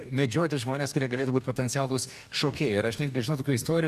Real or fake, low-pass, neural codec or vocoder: fake; 14.4 kHz; codec, 44.1 kHz, 2.6 kbps, DAC